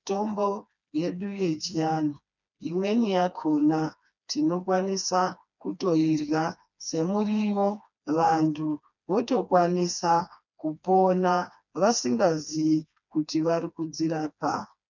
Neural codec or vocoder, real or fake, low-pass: codec, 16 kHz, 2 kbps, FreqCodec, smaller model; fake; 7.2 kHz